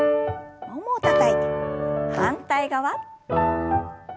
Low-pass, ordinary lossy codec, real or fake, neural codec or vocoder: none; none; real; none